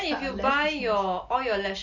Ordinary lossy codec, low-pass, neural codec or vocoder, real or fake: Opus, 64 kbps; 7.2 kHz; none; real